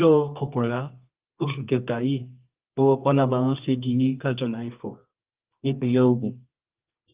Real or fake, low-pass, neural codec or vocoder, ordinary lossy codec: fake; 3.6 kHz; codec, 24 kHz, 0.9 kbps, WavTokenizer, medium music audio release; Opus, 32 kbps